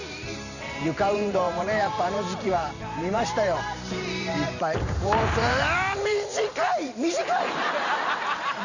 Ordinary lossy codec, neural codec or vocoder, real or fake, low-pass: none; vocoder, 44.1 kHz, 128 mel bands every 512 samples, BigVGAN v2; fake; 7.2 kHz